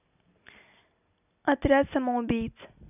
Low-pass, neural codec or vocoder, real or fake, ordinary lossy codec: 3.6 kHz; none; real; none